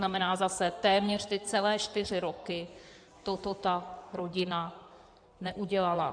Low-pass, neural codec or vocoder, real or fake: 9.9 kHz; codec, 16 kHz in and 24 kHz out, 2.2 kbps, FireRedTTS-2 codec; fake